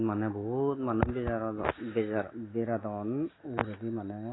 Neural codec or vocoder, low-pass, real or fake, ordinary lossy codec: none; 7.2 kHz; real; AAC, 16 kbps